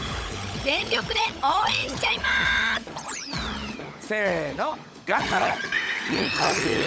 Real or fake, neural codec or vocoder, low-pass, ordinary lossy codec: fake; codec, 16 kHz, 16 kbps, FunCodec, trained on LibriTTS, 50 frames a second; none; none